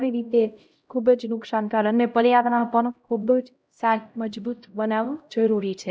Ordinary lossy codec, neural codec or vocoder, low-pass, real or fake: none; codec, 16 kHz, 0.5 kbps, X-Codec, HuBERT features, trained on LibriSpeech; none; fake